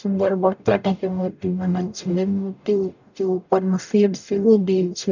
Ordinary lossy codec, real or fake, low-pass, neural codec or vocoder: none; fake; 7.2 kHz; codec, 44.1 kHz, 0.9 kbps, DAC